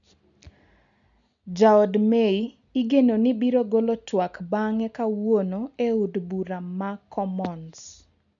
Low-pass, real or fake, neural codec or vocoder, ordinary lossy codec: 7.2 kHz; real; none; MP3, 96 kbps